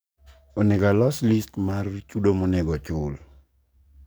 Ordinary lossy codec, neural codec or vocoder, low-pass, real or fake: none; codec, 44.1 kHz, 7.8 kbps, DAC; none; fake